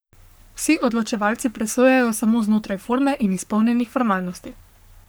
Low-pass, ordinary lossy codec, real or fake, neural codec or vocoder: none; none; fake; codec, 44.1 kHz, 3.4 kbps, Pupu-Codec